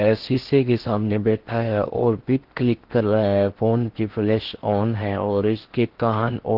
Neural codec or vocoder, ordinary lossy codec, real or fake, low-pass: codec, 16 kHz in and 24 kHz out, 0.6 kbps, FocalCodec, streaming, 4096 codes; Opus, 16 kbps; fake; 5.4 kHz